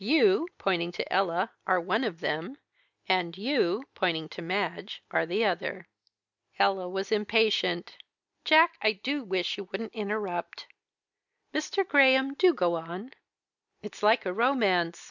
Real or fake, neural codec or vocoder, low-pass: real; none; 7.2 kHz